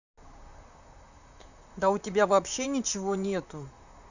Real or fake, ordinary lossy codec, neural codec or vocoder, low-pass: fake; none; vocoder, 44.1 kHz, 128 mel bands, Pupu-Vocoder; 7.2 kHz